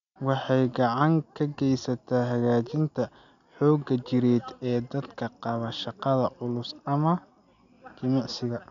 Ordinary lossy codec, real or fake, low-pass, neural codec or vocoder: none; real; 7.2 kHz; none